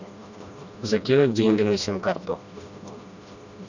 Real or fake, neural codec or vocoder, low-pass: fake; codec, 16 kHz, 1 kbps, FreqCodec, smaller model; 7.2 kHz